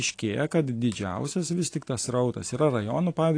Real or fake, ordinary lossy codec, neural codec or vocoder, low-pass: real; AAC, 48 kbps; none; 9.9 kHz